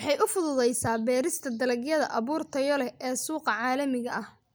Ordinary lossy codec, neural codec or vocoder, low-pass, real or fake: none; none; none; real